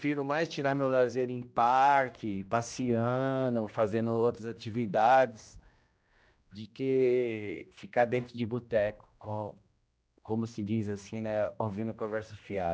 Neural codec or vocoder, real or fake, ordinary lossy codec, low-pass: codec, 16 kHz, 1 kbps, X-Codec, HuBERT features, trained on general audio; fake; none; none